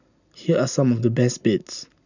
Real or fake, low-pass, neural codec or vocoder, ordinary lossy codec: real; 7.2 kHz; none; none